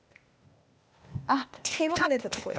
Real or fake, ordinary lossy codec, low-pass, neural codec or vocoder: fake; none; none; codec, 16 kHz, 0.8 kbps, ZipCodec